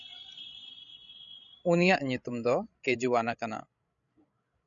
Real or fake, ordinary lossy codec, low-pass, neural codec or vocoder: fake; MP3, 96 kbps; 7.2 kHz; codec, 16 kHz, 16 kbps, FreqCodec, larger model